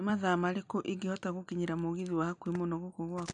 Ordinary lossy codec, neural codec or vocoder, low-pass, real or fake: none; none; 9.9 kHz; real